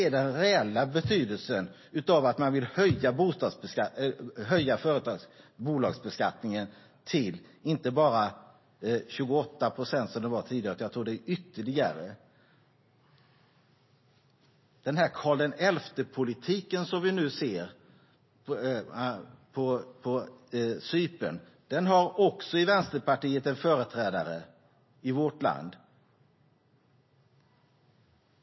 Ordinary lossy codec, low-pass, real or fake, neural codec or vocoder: MP3, 24 kbps; 7.2 kHz; real; none